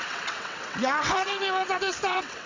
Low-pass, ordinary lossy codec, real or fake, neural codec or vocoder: 7.2 kHz; MP3, 64 kbps; fake; vocoder, 22.05 kHz, 80 mel bands, WaveNeXt